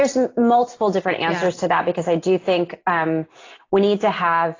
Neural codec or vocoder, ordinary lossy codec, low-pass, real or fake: none; AAC, 32 kbps; 7.2 kHz; real